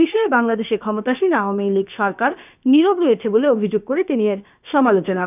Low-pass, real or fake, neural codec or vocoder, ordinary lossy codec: 3.6 kHz; fake; codec, 16 kHz, about 1 kbps, DyCAST, with the encoder's durations; none